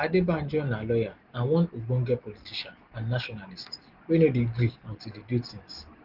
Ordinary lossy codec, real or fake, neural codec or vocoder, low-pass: Opus, 16 kbps; real; none; 5.4 kHz